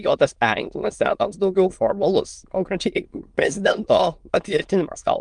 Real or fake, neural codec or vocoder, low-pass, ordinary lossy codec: fake; autoencoder, 22.05 kHz, a latent of 192 numbers a frame, VITS, trained on many speakers; 9.9 kHz; Opus, 32 kbps